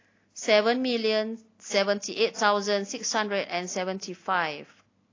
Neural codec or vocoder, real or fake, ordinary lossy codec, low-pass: none; real; AAC, 32 kbps; 7.2 kHz